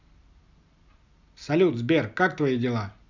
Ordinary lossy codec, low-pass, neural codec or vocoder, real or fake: none; 7.2 kHz; none; real